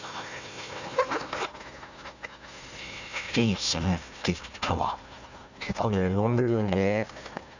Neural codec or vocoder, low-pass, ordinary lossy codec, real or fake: codec, 16 kHz, 1 kbps, FunCodec, trained on Chinese and English, 50 frames a second; 7.2 kHz; none; fake